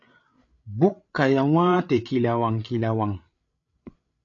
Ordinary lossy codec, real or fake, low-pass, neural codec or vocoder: AAC, 48 kbps; fake; 7.2 kHz; codec, 16 kHz, 8 kbps, FreqCodec, larger model